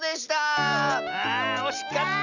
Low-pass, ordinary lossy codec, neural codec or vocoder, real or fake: 7.2 kHz; none; none; real